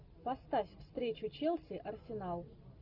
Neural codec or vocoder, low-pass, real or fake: none; 5.4 kHz; real